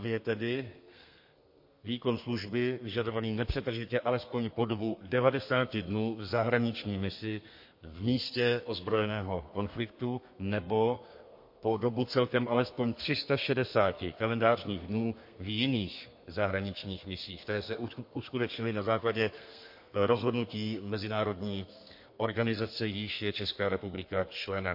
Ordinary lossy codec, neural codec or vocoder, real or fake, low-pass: MP3, 32 kbps; codec, 32 kHz, 1.9 kbps, SNAC; fake; 5.4 kHz